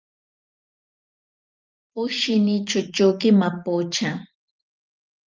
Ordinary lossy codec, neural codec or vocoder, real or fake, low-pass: Opus, 24 kbps; vocoder, 44.1 kHz, 128 mel bands every 512 samples, BigVGAN v2; fake; 7.2 kHz